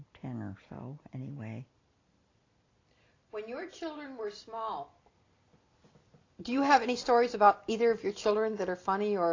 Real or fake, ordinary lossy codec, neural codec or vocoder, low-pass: real; AAC, 32 kbps; none; 7.2 kHz